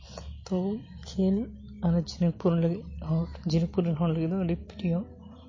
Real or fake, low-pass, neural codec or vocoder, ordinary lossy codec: real; 7.2 kHz; none; MP3, 32 kbps